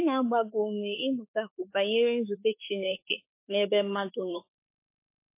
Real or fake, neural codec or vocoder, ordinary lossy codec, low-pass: fake; autoencoder, 48 kHz, 32 numbers a frame, DAC-VAE, trained on Japanese speech; MP3, 24 kbps; 3.6 kHz